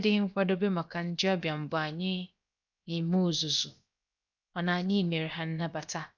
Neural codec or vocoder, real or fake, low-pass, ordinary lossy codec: codec, 16 kHz, 0.7 kbps, FocalCodec; fake; 7.2 kHz; none